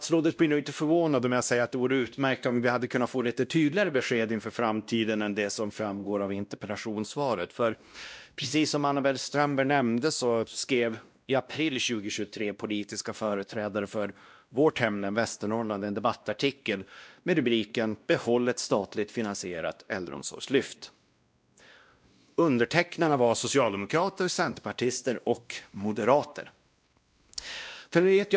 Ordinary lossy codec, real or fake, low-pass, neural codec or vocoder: none; fake; none; codec, 16 kHz, 1 kbps, X-Codec, WavLM features, trained on Multilingual LibriSpeech